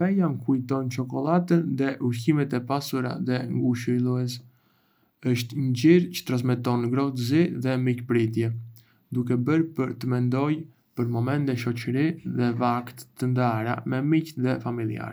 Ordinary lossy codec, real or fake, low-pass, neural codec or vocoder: none; real; none; none